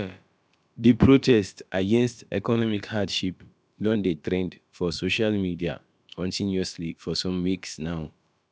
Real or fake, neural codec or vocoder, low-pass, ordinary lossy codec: fake; codec, 16 kHz, about 1 kbps, DyCAST, with the encoder's durations; none; none